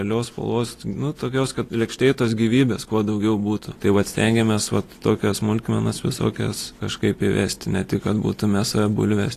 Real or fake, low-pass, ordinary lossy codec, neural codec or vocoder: real; 14.4 kHz; AAC, 48 kbps; none